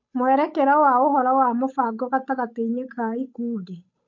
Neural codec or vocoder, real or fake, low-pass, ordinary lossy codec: codec, 16 kHz, 8 kbps, FunCodec, trained on Chinese and English, 25 frames a second; fake; 7.2 kHz; MP3, 48 kbps